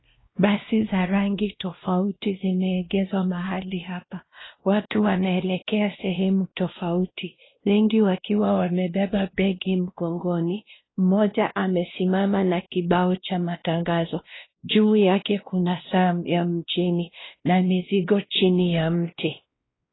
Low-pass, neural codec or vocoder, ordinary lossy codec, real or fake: 7.2 kHz; codec, 16 kHz, 1 kbps, X-Codec, WavLM features, trained on Multilingual LibriSpeech; AAC, 16 kbps; fake